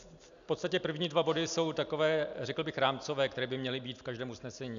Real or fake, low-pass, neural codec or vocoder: real; 7.2 kHz; none